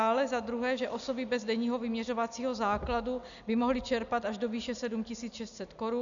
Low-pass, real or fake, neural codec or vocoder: 7.2 kHz; real; none